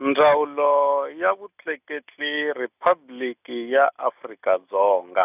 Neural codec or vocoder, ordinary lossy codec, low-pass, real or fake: none; none; 3.6 kHz; real